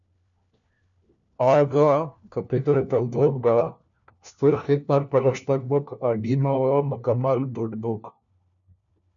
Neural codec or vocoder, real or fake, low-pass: codec, 16 kHz, 1 kbps, FunCodec, trained on LibriTTS, 50 frames a second; fake; 7.2 kHz